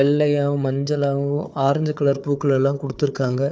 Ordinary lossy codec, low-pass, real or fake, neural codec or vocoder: none; none; fake; codec, 16 kHz, 4 kbps, FunCodec, trained on Chinese and English, 50 frames a second